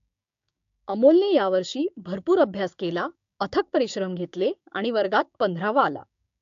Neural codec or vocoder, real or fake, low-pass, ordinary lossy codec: codec, 16 kHz, 6 kbps, DAC; fake; 7.2 kHz; AAC, 64 kbps